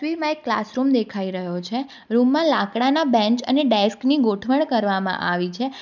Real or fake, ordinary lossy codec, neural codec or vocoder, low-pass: real; none; none; 7.2 kHz